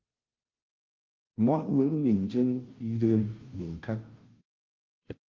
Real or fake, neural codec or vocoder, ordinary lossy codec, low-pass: fake; codec, 16 kHz, 0.5 kbps, FunCodec, trained on Chinese and English, 25 frames a second; Opus, 32 kbps; 7.2 kHz